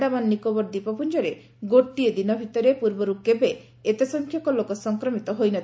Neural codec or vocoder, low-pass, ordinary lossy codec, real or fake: none; none; none; real